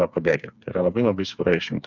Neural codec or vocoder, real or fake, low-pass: codec, 16 kHz, 4 kbps, FreqCodec, smaller model; fake; 7.2 kHz